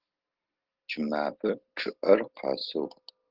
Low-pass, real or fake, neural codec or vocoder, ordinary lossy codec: 5.4 kHz; real; none; Opus, 16 kbps